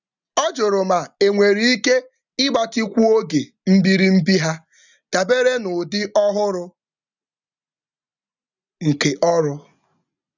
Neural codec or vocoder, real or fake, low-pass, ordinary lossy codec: none; real; 7.2 kHz; none